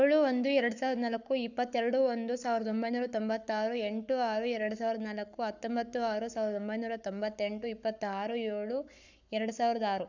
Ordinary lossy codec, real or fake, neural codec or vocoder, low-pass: none; fake; codec, 44.1 kHz, 7.8 kbps, Pupu-Codec; 7.2 kHz